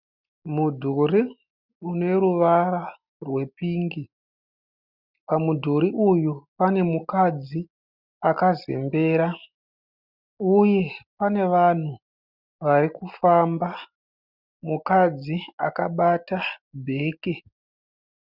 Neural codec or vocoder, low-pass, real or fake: none; 5.4 kHz; real